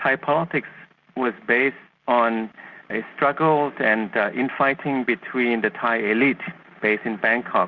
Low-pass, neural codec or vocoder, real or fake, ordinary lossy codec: 7.2 kHz; none; real; Opus, 64 kbps